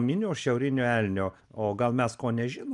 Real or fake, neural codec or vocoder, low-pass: real; none; 10.8 kHz